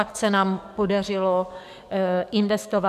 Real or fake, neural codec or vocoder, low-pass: fake; codec, 44.1 kHz, 7.8 kbps, DAC; 14.4 kHz